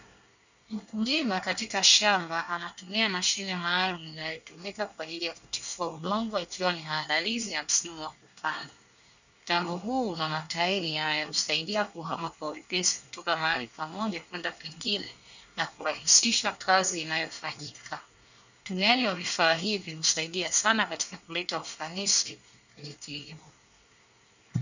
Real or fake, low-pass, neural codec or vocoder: fake; 7.2 kHz; codec, 24 kHz, 1 kbps, SNAC